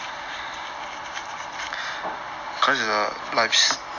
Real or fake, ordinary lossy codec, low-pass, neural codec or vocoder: real; none; 7.2 kHz; none